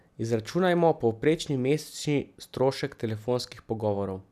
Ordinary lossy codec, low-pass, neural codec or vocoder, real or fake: none; 14.4 kHz; none; real